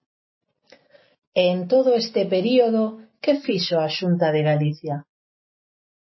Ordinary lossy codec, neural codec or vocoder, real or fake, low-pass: MP3, 24 kbps; none; real; 7.2 kHz